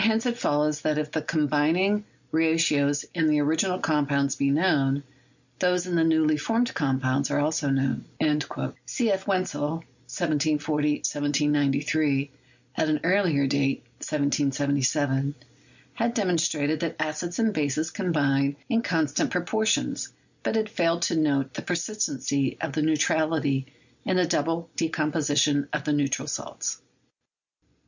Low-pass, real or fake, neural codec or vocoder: 7.2 kHz; real; none